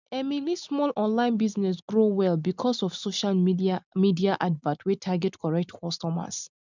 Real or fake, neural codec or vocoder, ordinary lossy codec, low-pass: real; none; none; 7.2 kHz